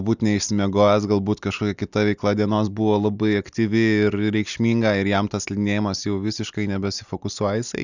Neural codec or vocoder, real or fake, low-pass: none; real; 7.2 kHz